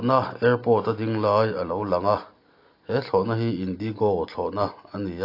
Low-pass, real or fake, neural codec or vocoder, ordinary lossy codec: 5.4 kHz; real; none; AAC, 32 kbps